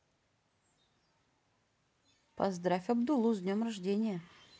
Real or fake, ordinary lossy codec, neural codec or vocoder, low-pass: real; none; none; none